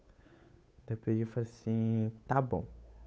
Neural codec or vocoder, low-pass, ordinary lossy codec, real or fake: codec, 16 kHz, 8 kbps, FunCodec, trained on Chinese and English, 25 frames a second; none; none; fake